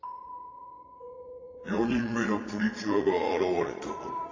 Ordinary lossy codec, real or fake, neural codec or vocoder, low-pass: AAC, 32 kbps; fake; vocoder, 22.05 kHz, 80 mel bands, Vocos; 7.2 kHz